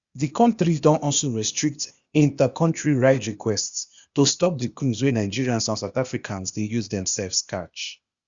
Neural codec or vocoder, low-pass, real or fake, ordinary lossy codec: codec, 16 kHz, 0.8 kbps, ZipCodec; 7.2 kHz; fake; Opus, 64 kbps